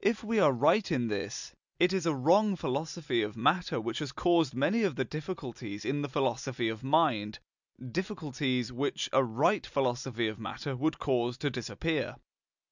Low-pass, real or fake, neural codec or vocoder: 7.2 kHz; real; none